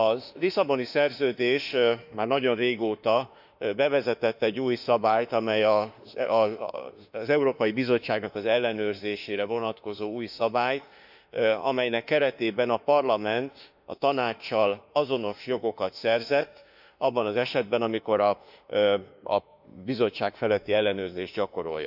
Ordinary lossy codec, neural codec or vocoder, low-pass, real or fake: none; autoencoder, 48 kHz, 32 numbers a frame, DAC-VAE, trained on Japanese speech; 5.4 kHz; fake